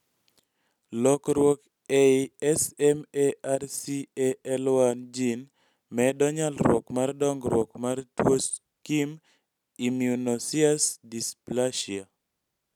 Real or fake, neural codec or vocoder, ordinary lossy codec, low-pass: real; none; none; 19.8 kHz